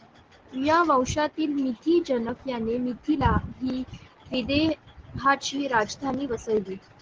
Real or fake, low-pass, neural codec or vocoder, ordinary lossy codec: real; 7.2 kHz; none; Opus, 16 kbps